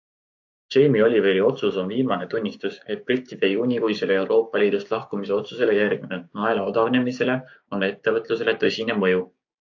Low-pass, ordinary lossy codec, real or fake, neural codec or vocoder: 7.2 kHz; AAC, 48 kbps; fake; codec, 16 kHz, 6 kbps, DAC